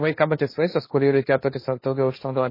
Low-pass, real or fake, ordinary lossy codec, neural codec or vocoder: 5.4 kHz; fake; MP3, 24 kbps; codec, 16 kHz, 1.1 kbps, Voila-Tokenizer